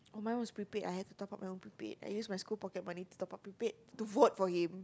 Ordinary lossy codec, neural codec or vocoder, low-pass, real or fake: none; none; none; real